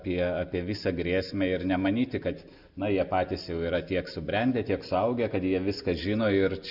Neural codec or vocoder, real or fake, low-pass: none; real; 5.4 kHz